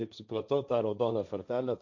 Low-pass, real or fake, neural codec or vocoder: 7.2 kHz; fake; codec, 16 kHz, 1.1 kbps, Voila-Tokenizer